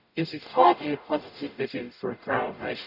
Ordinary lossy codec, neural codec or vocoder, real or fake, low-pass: none; codec, 44.1 kHz, 0.9 kbps, DAC; fake; 5.4 kHz